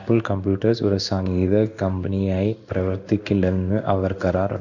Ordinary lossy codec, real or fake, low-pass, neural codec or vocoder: none; fake; 7.2 kHz; codec, 16 kHz in and 24 kHz out, 1 kbps, XY-Tokenizer